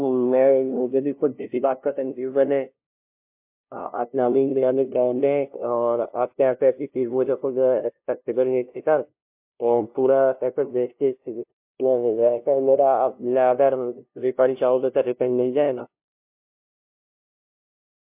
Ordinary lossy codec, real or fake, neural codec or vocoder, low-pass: MP3, 32 kbps; fake; codec, 16 kHz, 0.5 kbps, FunCodec, trained on LibriTTS, 25 frames a second; 3.6 kHz